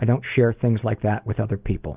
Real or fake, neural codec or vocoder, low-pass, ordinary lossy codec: real; none; 3.6 kHz; Opus, 24 kbps